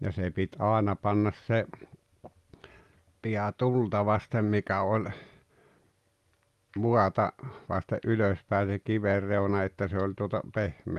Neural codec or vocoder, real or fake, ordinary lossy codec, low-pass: none; real; Opus, 32 kbps; 14.4 kHz